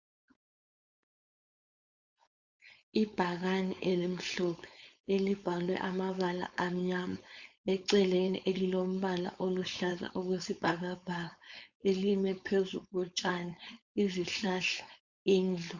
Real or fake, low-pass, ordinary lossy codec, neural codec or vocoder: fake; 7.2 kHz; Opus, 64 kbps; codec, 16 kHz, 4.8 kbps, FACodec